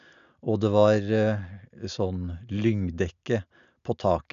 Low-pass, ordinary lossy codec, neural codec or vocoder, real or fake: 7.2 kHz; none; none; real